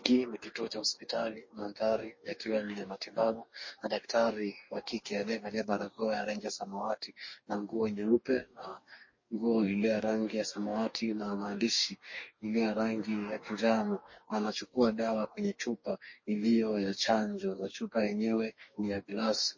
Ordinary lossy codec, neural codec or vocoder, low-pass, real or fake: MP3, 32 kbps; codec, 44.1 kHz, 2.6 kbps, DAC; 7.2 kHz; fake